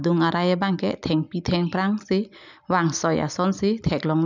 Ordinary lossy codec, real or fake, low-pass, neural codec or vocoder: none; real; 7.2 kHz; none